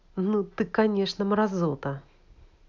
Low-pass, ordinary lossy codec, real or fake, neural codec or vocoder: 7.2 kHz; none; real; none